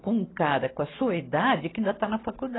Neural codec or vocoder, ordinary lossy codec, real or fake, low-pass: none; AAC, 16 kbps; real; 7.2 kHz